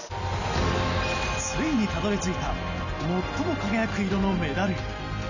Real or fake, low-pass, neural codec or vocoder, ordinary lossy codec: real; 7.2 kHz; none; none